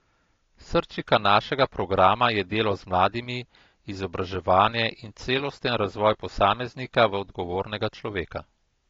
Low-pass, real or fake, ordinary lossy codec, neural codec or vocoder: 7.2 kHz; real; AAC, 32 kbps; none